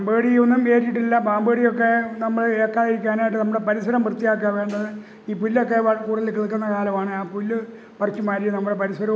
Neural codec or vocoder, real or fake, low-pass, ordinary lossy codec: none; real; none; none